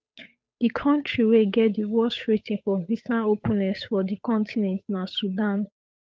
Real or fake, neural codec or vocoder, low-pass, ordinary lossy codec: fake; codec, 16 kHz, 8 kbps, FunCodec, trained on Chinese and English, 25 frames a second; none; none